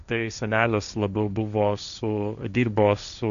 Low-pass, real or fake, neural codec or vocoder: 7.2 kHz; fake; codec, 16 kHz, 1.1 kbps, Voila-Tokenizer